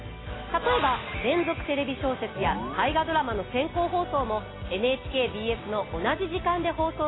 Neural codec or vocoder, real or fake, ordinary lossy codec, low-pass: none; real; AAC, 16 kbps; 7.2 kHz